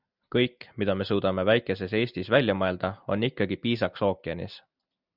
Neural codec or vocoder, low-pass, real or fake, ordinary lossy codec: none; 5.4 kHz; real; Opus, 64 kbps